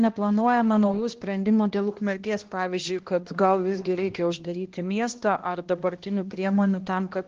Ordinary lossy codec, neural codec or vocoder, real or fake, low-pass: Opus, 16 kbps; codec, 16 kHz, 1 kbps, X-Codec, HuBERT features, trained on balanced general audio; fake; 7.2 kHz